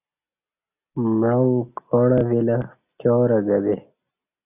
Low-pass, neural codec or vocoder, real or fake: 3.6 kHz; none; real